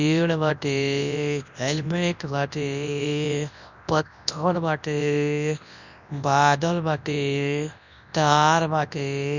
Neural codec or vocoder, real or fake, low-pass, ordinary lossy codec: codec, 24 kHz, 0.9 kbps, WavTokenizer, large speech release; fake; 7.2 kHz; none